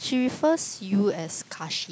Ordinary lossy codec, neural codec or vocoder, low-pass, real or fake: none; none; none; real